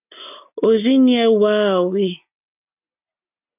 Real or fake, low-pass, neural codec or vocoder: real; 3.6 kHz; none